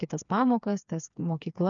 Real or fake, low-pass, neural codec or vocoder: fake; 7.2 kHz; codec, 16 kHz, 4 kbps, FreqCodec, smaller model